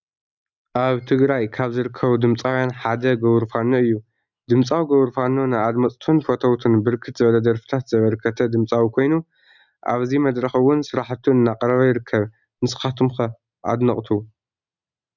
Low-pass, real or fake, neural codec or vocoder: 7.2 kHz; real; none